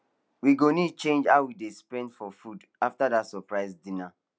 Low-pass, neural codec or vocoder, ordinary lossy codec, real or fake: none; none; none; real